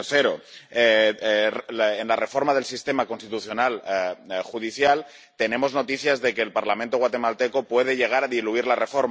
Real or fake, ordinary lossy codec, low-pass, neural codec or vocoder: real; none; none; none